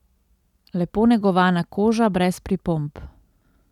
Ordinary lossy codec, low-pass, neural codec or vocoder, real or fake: none; 19.8 kHz; none; real